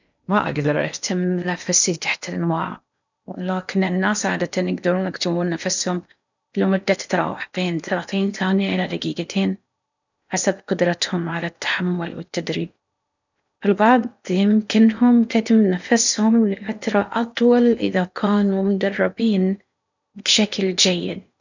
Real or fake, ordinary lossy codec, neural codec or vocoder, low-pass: fake; none; codec, 16 kHz in and 24 kHz out, 0.8 kbps, FocalCodec, streaming, 65536 codes; 7.2 kHz